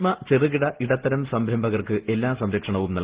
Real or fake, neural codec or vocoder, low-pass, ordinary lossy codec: real; none; 3.6 kHz; Opus, 16 kbps